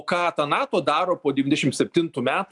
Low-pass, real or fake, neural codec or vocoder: 10.8 kHz; real; none